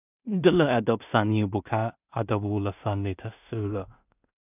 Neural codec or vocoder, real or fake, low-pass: codec, 16 kHz in and 24 kHz out, 0.4 kbps, LongCat-Audio-Codec, two codebook decoder; fake; 3.6 kHz